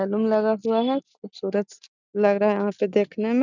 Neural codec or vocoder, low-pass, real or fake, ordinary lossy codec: none; 7.2 kHz; real; none